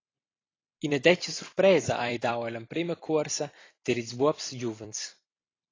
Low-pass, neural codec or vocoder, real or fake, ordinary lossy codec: 7.2 kHz; vocoder, 44.1 kHz, 128 mel bands every 256 samples, BigVGAN v2; fake; AAC, 32 kbps